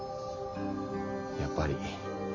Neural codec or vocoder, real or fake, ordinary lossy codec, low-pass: none; real; MP3, 32 kbps; 7.2 kHz